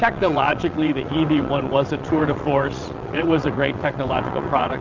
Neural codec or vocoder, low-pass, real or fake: vocoder, 22.05 kHz, 80 mel bands, WaveNeXt; 7.2 kHz; fake